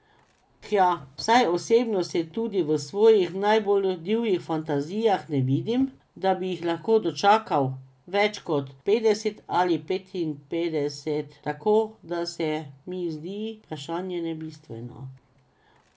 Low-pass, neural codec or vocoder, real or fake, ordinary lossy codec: none; none; real; none